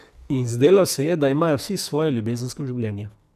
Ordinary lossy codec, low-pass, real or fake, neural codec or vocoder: none; 14.4 kHz; fake; codec, 44.1 kHz, 2.6 kbps, SNAC